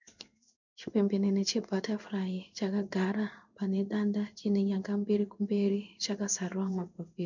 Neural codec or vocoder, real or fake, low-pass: codec, 16 kHz in and 24 kHz out, 1 kbps, XY-Tokenizer; fake; 7.2 kHz